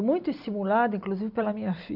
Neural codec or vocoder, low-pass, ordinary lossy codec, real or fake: none; 5.4 kHz; none; real